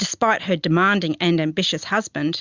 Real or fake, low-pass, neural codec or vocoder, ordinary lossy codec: real; 7.2 kHz; none; Opus, 64 kbps